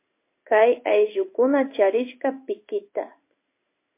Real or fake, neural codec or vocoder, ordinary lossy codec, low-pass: fake; codec, 16 kHz in and 24 kHz out, 1 kbps, XY-Tokenizer; MP3, 24 kbps; 3.6 kHz